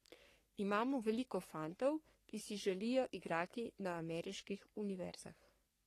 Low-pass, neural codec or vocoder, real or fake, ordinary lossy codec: 14.4 kHz; codec, 44.1 kHz, 3.4 kbps, Pupu-Codec; fake; AAC, 48 kbps